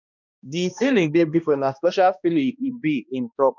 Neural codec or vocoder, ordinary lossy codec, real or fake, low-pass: codec, 16 kHz, 1 kbps, X-Codec, HuBERT features, trained on balanced general audio; none; fake; 7.2 kHz